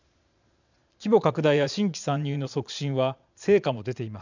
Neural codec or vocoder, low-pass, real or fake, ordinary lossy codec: vocoder, 22.05 kHz, 80 mel bands, Vocos; 7.2 kHz; fake; none